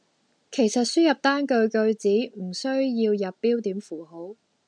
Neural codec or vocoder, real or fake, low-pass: none; real; 9.9 kHz